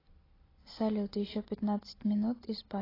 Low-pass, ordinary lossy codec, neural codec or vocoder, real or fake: 5.4 kHz; AAC, 24 kbps; none; real